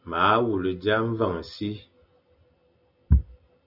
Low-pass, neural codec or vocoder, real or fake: 5.4 kHz; none; real